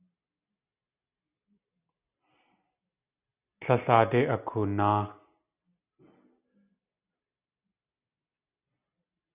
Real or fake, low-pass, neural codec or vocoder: real; 3.6 kHz; none